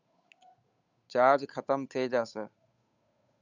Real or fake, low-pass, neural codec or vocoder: fake; 7.2 kHz; codec, 16 kHz, 8 kbps, FunCodec, trained on Chinese and English, 25 frames a second